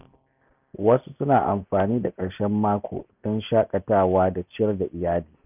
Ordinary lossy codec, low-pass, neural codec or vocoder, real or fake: none; 3.6 kHz; none; real